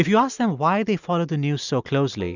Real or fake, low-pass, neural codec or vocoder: real; 7.2 kHz; none